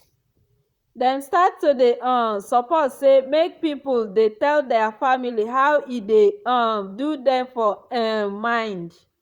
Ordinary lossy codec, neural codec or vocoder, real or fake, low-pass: Opus, 64 kbps; vocoder, 44.1 kHz, 128 mel bands, Pupu-Vocoder; fake; 19.8 kHz